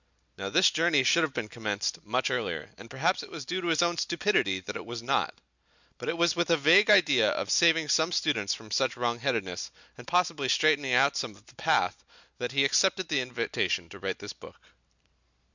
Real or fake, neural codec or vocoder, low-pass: real; none; 7.2 kHz